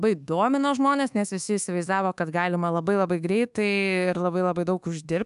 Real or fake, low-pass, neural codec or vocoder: fake; 10.8 kHz; codec, 24 kHz, 1.2 kbps, DualCodec